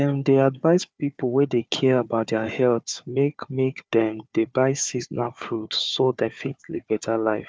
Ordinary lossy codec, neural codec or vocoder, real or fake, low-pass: none; codec, 16 kHz, 4 kbps, FunCodec, trained on Chinese and English, 50 frames a second; fake; none